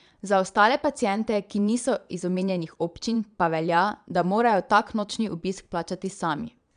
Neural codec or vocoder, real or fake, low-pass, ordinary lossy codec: vocoder, 22.05 kHz, 80 mel bands, Vocos; fake; 9.9 kHz; none